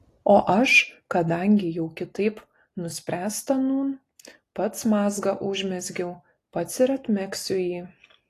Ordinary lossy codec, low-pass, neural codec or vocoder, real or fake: AAC, 48 kbps; 14.4 kHz; none; real